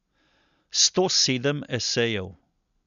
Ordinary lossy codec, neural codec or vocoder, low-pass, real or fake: MP3, 96 kbps; none; 7.2 kHz; real